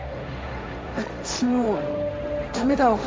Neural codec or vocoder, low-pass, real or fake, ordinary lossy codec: codec, 16 kHz, 1.1 kbps, Voila-Tokenizer; none; fake; none